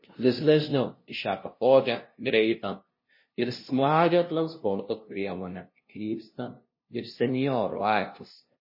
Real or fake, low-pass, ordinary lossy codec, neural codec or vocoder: fake; 5.4 kHz; MP3, 24 kbps; codec, 16 kHz, 0.5 kbps, FunCodec, trained on LibriTTS, 25 frames a second